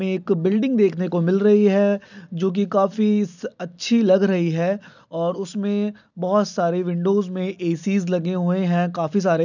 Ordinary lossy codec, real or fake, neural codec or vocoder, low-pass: none; real; none; 7.2 kHz